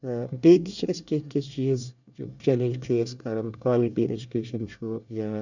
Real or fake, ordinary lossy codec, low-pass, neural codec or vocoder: fake; none; 7.2 kHz; codec, 24 kHz, 1 kbps, SNAC